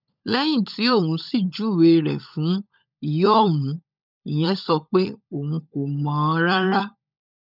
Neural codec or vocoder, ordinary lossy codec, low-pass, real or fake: codec, 16 kHz, 16 kbps, FunCodec, trained on LibriTTS, 50 frames a second; none; 5.4 kHz; fake